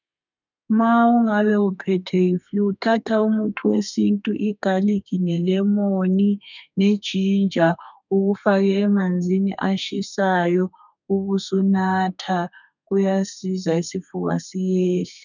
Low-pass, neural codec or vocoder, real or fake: 7.2 kHz; codec, 32 kHz, 1.9 kbps, SNAC; fake